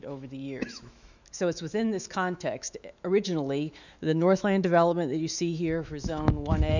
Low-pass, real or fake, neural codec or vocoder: 7.2 kHz; fake; autoencoder, 48 kHz, 128 numbers a frame, DAC-VAE, trained on Japanese speech